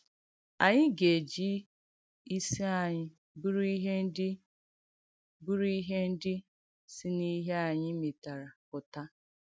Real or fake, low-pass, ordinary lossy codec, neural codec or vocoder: real; none; none; none